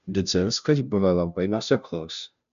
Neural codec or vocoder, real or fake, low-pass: codec, 16 kHz, 0.5 kbps, FunCodec, trained on Chinese and English, 25 frames a second; fake; 7.2 kHz